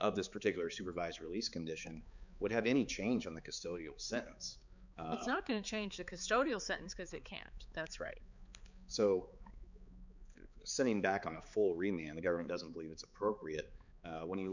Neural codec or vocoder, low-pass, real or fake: codec, 16 kHz, 4 kbps, X-Codec, HuBERT features, trained on balanced general audio; 7.2 kHz; fake